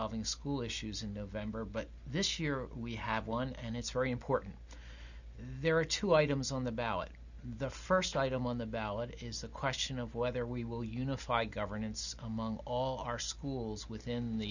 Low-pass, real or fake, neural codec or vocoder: 7.2 kHz; real; none